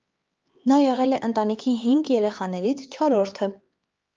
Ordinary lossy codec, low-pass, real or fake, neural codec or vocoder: Opus, 24 kbps; 7.2 kHz; fake; codec, 16 kHz, 4 kbps, X-Codec, HuBERT features, trained on LibriSpeech